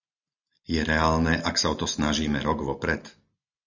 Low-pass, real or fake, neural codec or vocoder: 7.2 kHz; real; none